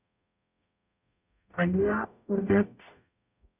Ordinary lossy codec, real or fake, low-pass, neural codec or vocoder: none; fake; 3.6 kHz; codec, 44.1 kHz, 0.9 kbps, DAC